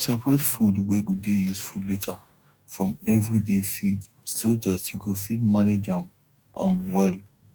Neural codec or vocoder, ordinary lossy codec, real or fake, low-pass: codec, 44.1 kHz, 2.6 kbps, DAC; none; fake; 19.8 kHz